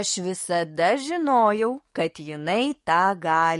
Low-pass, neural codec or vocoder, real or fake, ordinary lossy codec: 14.4 kHz; codec, 44.1 kHz, 7.8 kbps, DAC; fake; MP3, 48 kbps